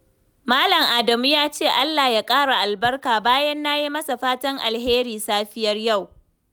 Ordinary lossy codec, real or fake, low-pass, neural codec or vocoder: none; real; none; none